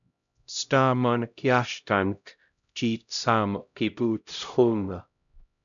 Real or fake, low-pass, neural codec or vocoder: fake; 7.2 kHz; codec, 16 kHz, 0.5 kbps, X-Codec, HuBERT features, trained on LibriSpeech